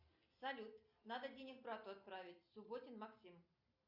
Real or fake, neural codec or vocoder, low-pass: real; none; 5.4 kHz